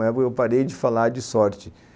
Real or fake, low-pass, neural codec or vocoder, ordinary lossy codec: real; none; none; none